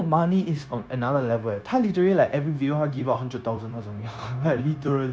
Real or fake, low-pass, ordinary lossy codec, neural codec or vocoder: fake; none; none; codec, 16 kHz, 0.9 kbps, LongCat-Audio-Codec